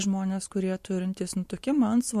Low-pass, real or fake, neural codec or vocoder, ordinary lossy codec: 14.4 kHz; real; none; MP3, 64 kbps